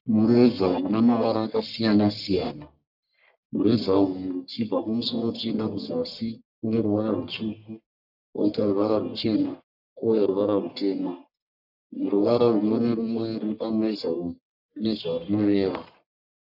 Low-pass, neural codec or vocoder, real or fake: 5.4 kHz; codec, 44.1 kHz, 1.7 kbps, Pupu-Codec; fake